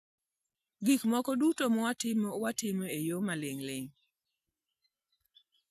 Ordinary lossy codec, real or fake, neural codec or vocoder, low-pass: none; real; none; 14.4 kHz